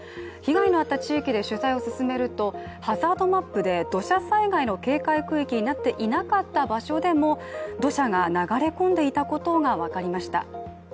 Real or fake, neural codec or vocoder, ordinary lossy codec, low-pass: real; none; none; none